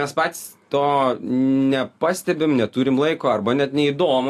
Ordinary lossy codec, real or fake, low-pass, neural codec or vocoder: AAC, 64 kbps; real; 14.4 kHz; none